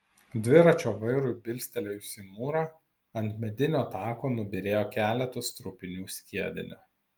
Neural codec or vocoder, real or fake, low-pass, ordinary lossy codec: none; real; 19.8 kHz; Opus, 32 kbps